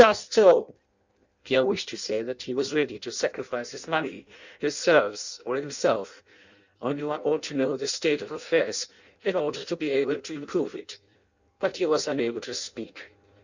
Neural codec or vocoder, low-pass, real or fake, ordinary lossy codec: codec, 16 kHz in and 24 kHz out, 0.6 kbps, FireRedTTS-2 codec; 7.2 kHz; fake; Opus, 64 kbps